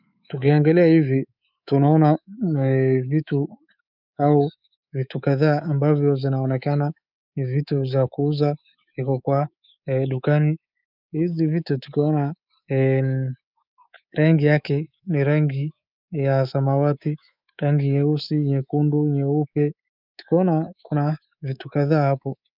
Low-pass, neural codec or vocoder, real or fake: 5.4 kHz; codec, 24 kHz, 3.1 kbps, DualCodec; fake